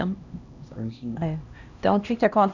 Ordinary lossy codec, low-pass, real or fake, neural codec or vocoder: none; 7.2 kHz; fake; codec, 16 kHz, 1 kbps, X-Codec, HuBERT features, trained on LibriSpeech